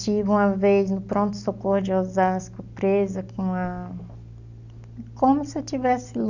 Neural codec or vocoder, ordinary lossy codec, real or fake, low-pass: none; none; real; 7.2 kHz